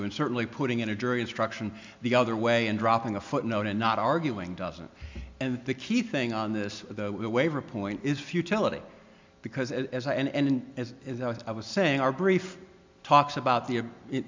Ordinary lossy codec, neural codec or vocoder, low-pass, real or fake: MP3, 64 kbps; none; 7.2 kHz; real